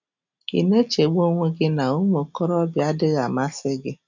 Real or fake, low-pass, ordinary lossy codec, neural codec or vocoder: real; 7.2 kHz; none; none